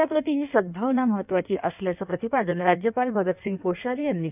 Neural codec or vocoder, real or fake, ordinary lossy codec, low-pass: codec, 16 kHz in and 24 kHz out, 1.1 kbps, FireRedTTS-2 codec; fake; none; 3.6 kHz